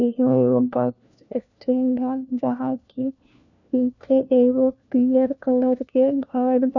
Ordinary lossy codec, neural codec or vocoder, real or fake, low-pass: none; codec, 16 kHz, 1 kbps, FunCodec, trained on LibriTTS, 50 frames a second; fake; 7.2 kHz